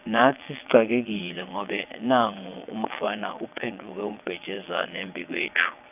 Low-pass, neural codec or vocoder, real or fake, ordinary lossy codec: 3.6 kHz; vocoder, 22.05 kHz, 80 mel bands, WaveNeXt; fake; none